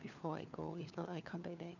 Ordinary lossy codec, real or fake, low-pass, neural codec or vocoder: none; fake; 7.2 kHz; codec, 16 kHz, 4 kbps, FunCodec, trained on LibriTTS, 50 frames a second